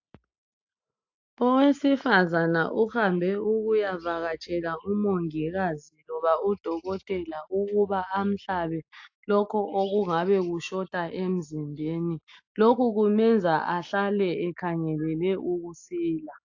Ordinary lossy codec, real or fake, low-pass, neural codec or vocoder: AAC, 48 kbps; real; 7.2 kHz; none